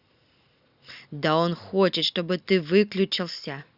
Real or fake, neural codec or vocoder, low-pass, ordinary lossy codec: real; none; 5.4 kHz; Opus, 64 kbps